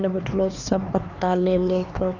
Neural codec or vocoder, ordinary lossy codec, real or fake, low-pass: codec, 16 kHz, 2 kbps, X-Codec, HuBERT features, trained on LibriSpeech; none; fake; 7.2 kHz